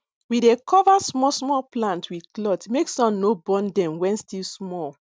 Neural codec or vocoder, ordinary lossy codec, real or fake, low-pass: none; none; real; none